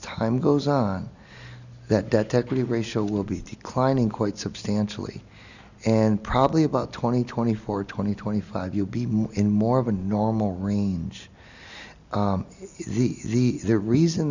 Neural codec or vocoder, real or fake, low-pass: none; real; 7.2 kHz